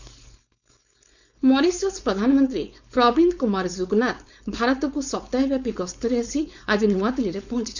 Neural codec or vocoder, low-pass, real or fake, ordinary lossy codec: codec, 16 kHz, 4.8 kbps, FACodec; 7.2 kHz; fake; none